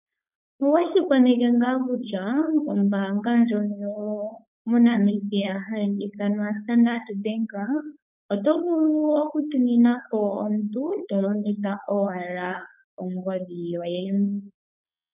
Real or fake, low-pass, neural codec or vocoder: fake; 3.6 kHz; codec, 16 kHz, 4.8 kbps, FACodec